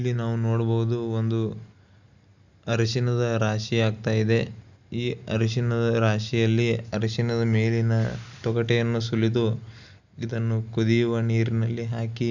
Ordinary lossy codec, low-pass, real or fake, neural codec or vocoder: none; 7.2 kHz; real; none